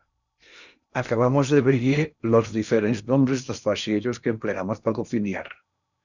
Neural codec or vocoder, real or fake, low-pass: codec, 16 kHz in and 24 kHz out, 0.8 kbps, FocalCodec, streaming, 65536 codes; fake; 7.2 kHz